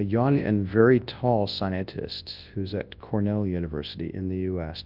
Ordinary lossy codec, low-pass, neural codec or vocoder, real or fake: Opus, 24 kbps; 5.4 kHz; codec, 24 kHz, 0.9 kbps, WavTokenizer, large speech release; fake